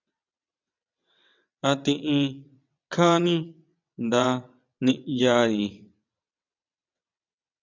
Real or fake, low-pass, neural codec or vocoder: fake; 7.2 kHz; vocoder, 22.05 kHz, 80 mel bands, WaveNeXt